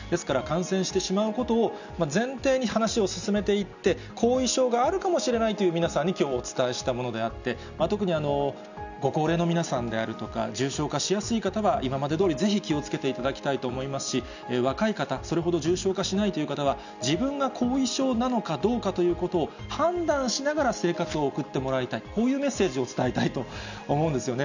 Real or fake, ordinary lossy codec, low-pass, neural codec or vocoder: real; none; 7.2 kHz; none